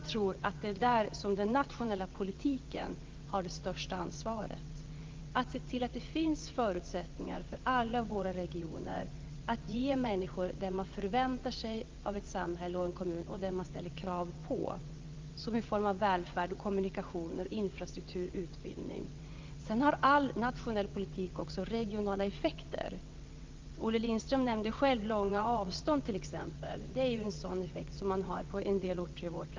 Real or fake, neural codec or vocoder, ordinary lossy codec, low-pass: fake; vocoder, 22.05 kHz, 80 mel bands, WaveNeXt; Opus, 32 kbps; 7.2 kHz